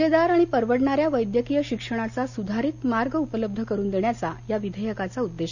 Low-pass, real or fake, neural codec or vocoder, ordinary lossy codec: 7.2 kHz; real; none; none